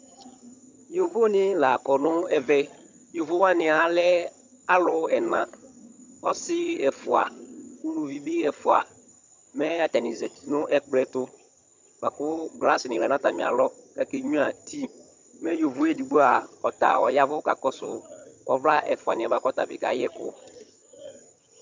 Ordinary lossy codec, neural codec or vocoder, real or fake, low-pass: MP3, 64 kbps; vocoder, 22.05 kHz, 80 mel bands, HiFi-GAN; fake; 7.2 kHz